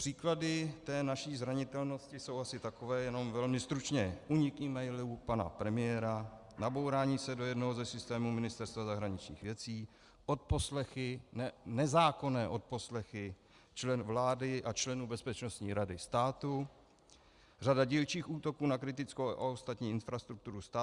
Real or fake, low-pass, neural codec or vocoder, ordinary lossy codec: real; 10.8 kHz; none; Opus, 64 kbps